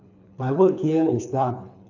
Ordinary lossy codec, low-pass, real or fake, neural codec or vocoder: none; 7.2 kHz; fake; codec, 24 kHz, 3 kbps, HILCodec